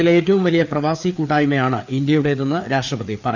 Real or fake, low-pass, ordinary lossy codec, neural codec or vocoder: fake; 7.2 kHz; none; codec, 16 kHz, 4 kbps, FreqCodec, larger model